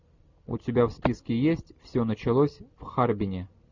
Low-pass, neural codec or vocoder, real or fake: 7.2 kHz; none; real